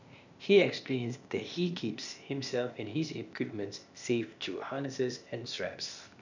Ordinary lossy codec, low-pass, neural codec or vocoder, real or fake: none; 7.2 kHz; codec, 16 kHz, 0.8 kbps, ZipCodec; fake